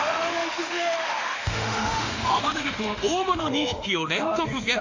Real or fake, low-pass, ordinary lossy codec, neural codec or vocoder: fake; 7.2 kHz; AAC, 48 kbps; autoencoder, 48 kHz, 32 numbers a frame, DAC-VAE, trained on Japanese speech